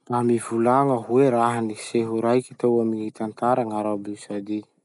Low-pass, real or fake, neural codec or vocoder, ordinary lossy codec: 10.8 kHz; real; none; none